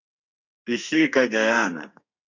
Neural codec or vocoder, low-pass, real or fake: codec, 32 kHz, 1.9 kbps, SNAC; 7.2 kHz; fake